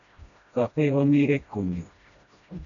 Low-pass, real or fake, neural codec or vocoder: 7.2 kHz; fake; codec, 16 kHz, 1 kbps, FreqCodec, smaller model